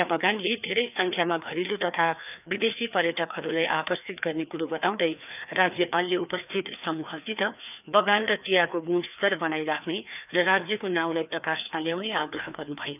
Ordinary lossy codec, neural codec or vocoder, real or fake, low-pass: none; codec, 16 kHz, 2 kbps, FreqCodec, larger model; fake; 3.6 kHz